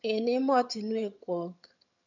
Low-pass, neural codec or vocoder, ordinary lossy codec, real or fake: 7.2 kHz; vocoder, 22.05 kHz, 80 mel bands, HiFi-GAN; none; fake